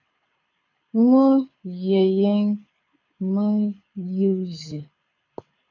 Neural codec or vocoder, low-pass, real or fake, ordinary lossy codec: codec, 24 kHz, 6 kbps, HILCodec; 7.2 kHz; fake; AAC, 48 kbps